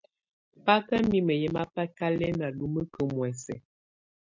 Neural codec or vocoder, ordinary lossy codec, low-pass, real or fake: none; MP3, 64 kbps; 7.2 kHz; real